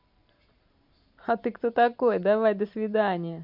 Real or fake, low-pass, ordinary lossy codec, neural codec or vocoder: fake; 5.4 kHz; MP3, 48 kbps; vocoder, 44.1 kHz, 128 mel bands every 512 samples, BigVGAN v2